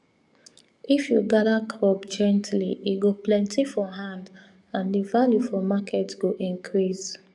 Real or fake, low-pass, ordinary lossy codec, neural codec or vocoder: fake; 10.8 kHz; none; codec, 44.1 kHz, 7.8 kbps, DAC